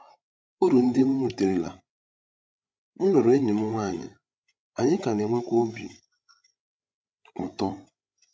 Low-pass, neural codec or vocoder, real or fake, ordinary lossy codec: none; codec, 16 kHz, 16 kbps, FreqCodec, larger model; fake; none